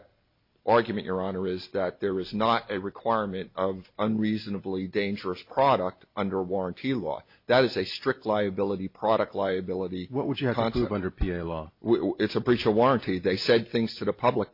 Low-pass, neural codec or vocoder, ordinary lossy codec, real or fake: 5.4 kHz; none; MP3, 32 kbps; real